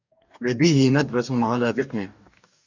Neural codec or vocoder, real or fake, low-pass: codec, 44.1 kHz, 2.6 kbps, DAC; fake; 7.2 kHz